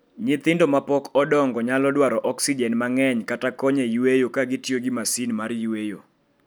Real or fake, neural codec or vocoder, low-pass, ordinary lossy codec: real; none; none; none